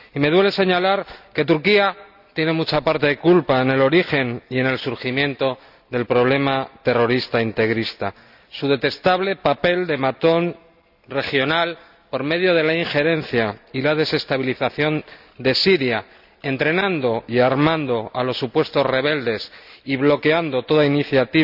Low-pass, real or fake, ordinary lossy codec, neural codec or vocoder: 5.4 kHz; real; none; none